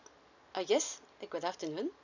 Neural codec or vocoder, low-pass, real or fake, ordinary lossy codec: none; 7.2 kHz; real; none